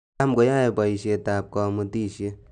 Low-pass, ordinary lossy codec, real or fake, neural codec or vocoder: 9.9 kHz; none; real; none